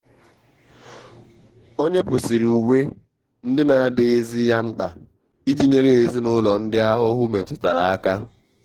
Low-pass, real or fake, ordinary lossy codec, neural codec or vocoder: 19.8 kHz; fake; Opus, 16 kbps; codec, 44.1 kHz, 2.6 kbps, DAC